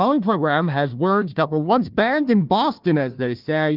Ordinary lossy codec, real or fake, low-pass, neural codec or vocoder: Opus, 24 kbps; fake; 5.4 kHz; codec, 16 kHz, 1 kbps, FunCodec, trained on Chinese and English, 50 frames a second